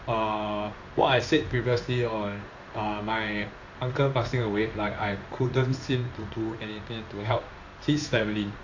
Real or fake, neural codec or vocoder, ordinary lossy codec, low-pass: fake; codec, 16 kHz in and 24 kHz out, 1 kbps, XY-Tokenizer; AAC, 48 kbps; 7.2 kHz